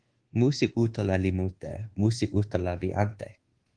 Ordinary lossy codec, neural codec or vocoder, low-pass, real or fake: Opus, 16 kbps; codec, 24 kHz, 1.2 kbps, DualCodec; 9.9 kHz; fake